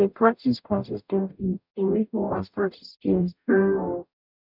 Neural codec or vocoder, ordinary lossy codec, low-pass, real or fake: codec, 44.1 kHz, 0.9 kbps, DAC; none; 5.4 kHz; fake